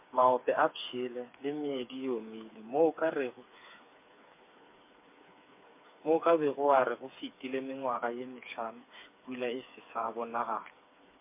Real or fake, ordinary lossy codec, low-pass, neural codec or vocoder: fake; MP3, 24 kbps; 3.6 kHz; codec, 16 kHz, 4 kbps, FreqCodec, smaller model